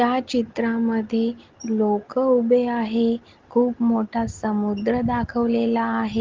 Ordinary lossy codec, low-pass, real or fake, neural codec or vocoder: Opus, 16 kbps; 7.2 kHz; real; none